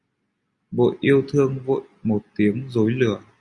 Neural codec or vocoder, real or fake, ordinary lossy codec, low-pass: none; real; Opus, 64 kbps; 10.8 kHz